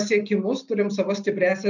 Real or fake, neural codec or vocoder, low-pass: real; none; 7.2 kHz